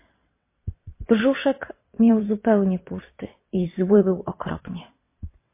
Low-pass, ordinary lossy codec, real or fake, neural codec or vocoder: 3.6 kHz; MP3, 24 kbps; fake; vocoder, 22.05 kHz, 80 mel bands, WaveNeXt